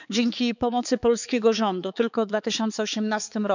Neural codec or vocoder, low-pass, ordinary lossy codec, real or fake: codec, 16 kHz, 4 kbps, X-Codec, HuBERT features, trained on balanced general audio; 7.2 kHz; none; fake